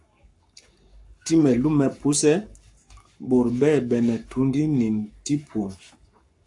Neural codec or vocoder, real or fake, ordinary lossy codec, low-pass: codec, 44.1 kHz, 7.8 kbps, Pupu-Codec; fake; AAC, 64 kbps; 10.8 kHz